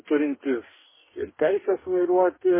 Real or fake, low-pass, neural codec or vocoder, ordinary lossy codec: fake; 3.6 kHz; codec, 44.1 kHz, 2.6 kbps, DAC; MP3, 16 kbps